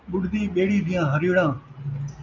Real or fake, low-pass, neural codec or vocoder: real; 7.2 kHz; none